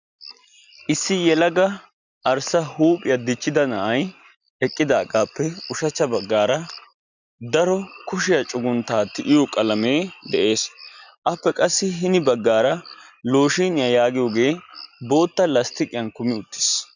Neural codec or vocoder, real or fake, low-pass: none; real; 7.2 kHz